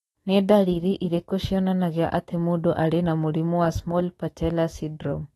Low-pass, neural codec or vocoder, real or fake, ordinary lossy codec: 19.8 kHz; autoencoder, 48 kHz, 128 numbers a frame, DAC-VAE, trained on Japanese speech; fake; AAC, 32 kbps